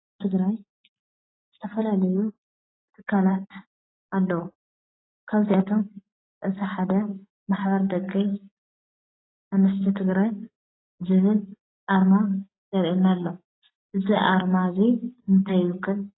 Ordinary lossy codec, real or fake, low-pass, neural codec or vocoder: AAC, 16 kbps; real; 7.2 kHz; none